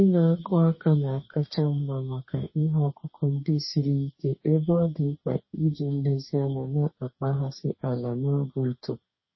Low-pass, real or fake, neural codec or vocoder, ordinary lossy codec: 7.2 kHz; fake; codec, 44.1 kHz, 2.6 kbps, DAC; MP3, 24 kbps